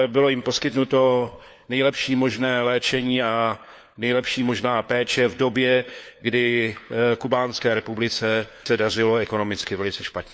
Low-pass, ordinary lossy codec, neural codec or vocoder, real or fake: none; none; codec, 16 kHz, 4 kbps, FunCodec, trained on LibriTTS, 50 frames a second; fake